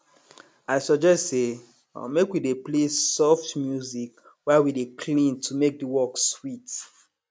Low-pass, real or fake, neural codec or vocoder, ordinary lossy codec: none; real; none; none